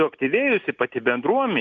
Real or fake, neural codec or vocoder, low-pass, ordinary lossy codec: real; none; 7.2 kHz; Opus, 64 kbps